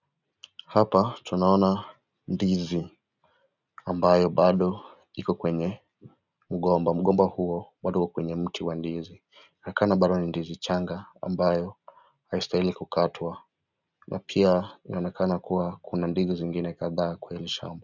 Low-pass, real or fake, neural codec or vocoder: 7.2 kHz; real; none